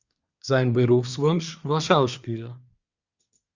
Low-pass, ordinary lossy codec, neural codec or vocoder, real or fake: 7.2 kHz; Opus, 64 kbps; codec, 24 kHz, 1 kbps, SNAC; fake